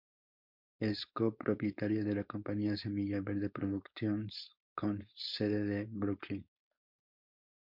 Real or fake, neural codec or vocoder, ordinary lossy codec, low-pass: fake; codec, 16 kHz, 4.8 kbps, FACodec; MP3, 48 kbps; 5.4 kHz